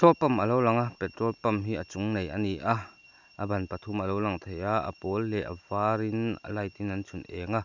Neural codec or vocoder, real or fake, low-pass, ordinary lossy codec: none; real; 7.2 kHz; none